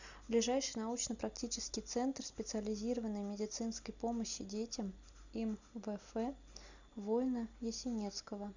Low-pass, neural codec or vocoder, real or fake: 7.2 kHz; none; real